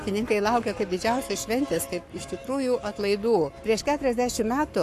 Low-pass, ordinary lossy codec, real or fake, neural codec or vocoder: 14.4 kHz; AAC, 64 kbps; fake; codec, 44.1 kHz, 7.8 kbps, Pupu-Codec